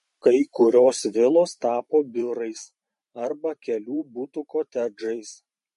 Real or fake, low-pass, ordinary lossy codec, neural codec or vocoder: fake; 14.4 kHz; MP3, 48 kbps; vocoder, 44.1 kHz, 128 mel bands every 512 samples, BigVGAN v2